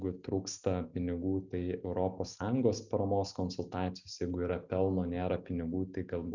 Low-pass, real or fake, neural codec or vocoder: 7.2 kHz; real; none